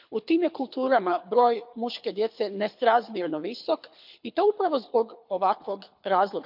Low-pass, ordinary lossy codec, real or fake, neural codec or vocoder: 5.4 kHz; MP3, 48 kbps; fake; codec, 24 kHz, 6 kbps, HILCodec